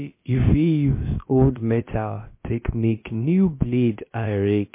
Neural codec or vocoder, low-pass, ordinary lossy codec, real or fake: codec, 16 kHz, about 1 kbps, DyCAST, with the encoder's durations; 3.6 kHz; MP3, 24 kbps; fake